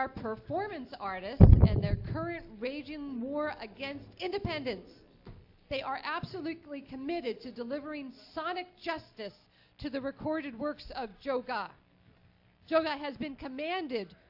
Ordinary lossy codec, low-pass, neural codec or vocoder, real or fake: AAC, 48 kbps; 5.4 kHz; none; real